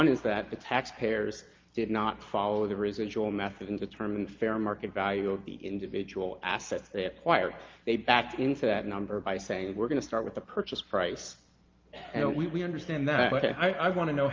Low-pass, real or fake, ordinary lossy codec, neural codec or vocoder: 7.2 kHz; real; Opus, 32 kbps; none